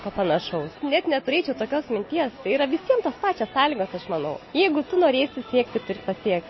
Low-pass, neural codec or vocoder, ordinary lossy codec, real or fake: 7.2 kHz; none; MP3, 24 kbps; real